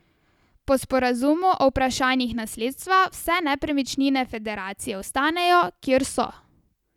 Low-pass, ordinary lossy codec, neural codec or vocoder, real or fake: 19.8 kHz; none; none; real